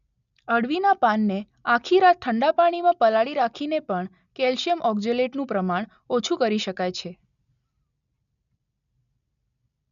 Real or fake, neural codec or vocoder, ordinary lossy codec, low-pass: real; none; none; 7.2 kHz